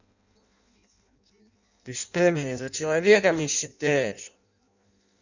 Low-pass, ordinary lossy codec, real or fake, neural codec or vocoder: 7.2 kHz; none; fake; codec, 16 kHz in and 24 kHz out, 0.6 kbps, FireRedTTS-2 codec